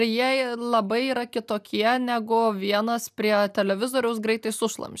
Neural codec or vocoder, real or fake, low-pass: none; real; 14.4 kHz